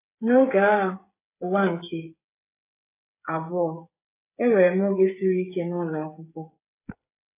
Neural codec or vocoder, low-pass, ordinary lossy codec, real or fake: codec, 16 kHz, 8 kbps, FreqCodec, smaller model; 3.6 kHz; AAC, 24 kbps; fake